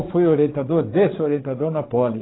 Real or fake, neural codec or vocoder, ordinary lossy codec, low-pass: fake; codec, 16 kHz, 16 kbps, FreqCodec, larger model; AAC, 16 kbps; 7.2 kHz